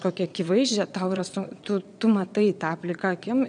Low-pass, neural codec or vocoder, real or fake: 9.9 kHz; vocoder, 22.05 kHz, 80 mel bands, Vocos; fake